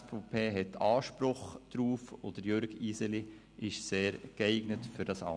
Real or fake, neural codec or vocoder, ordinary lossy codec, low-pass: real; none; none; 9.9 kHz